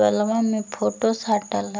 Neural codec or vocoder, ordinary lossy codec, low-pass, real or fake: none; none; none; real